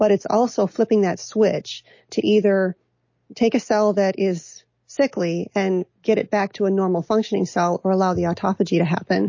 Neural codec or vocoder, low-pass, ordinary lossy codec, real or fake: none; 7.2 kHz; MP3, 32 kbps; real